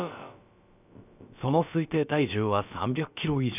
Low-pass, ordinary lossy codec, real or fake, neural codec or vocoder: 3.6 kHz; none; fake; codec, 16 kHz, about 1 kbps, DyCAST, with the encoder's durations